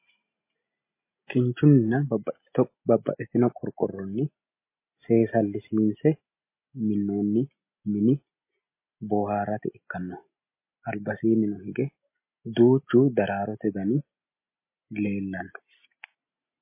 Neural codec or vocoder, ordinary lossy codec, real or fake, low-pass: none; MP3, 24 kbps; real; 3.6 kHz